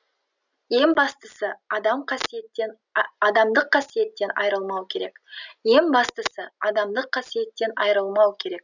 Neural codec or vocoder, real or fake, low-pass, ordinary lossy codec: none; real; 7.2 kHz; none